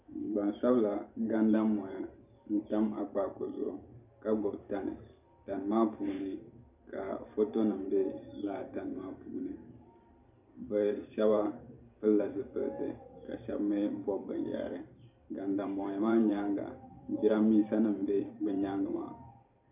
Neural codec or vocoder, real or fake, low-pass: vocoder, 44.1 kHz, 128 mel bands every 512 samples, BigVGAN v2; fake; 3.6 kHz